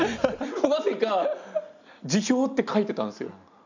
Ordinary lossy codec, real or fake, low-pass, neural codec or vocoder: none; real; 7.2 kHz; none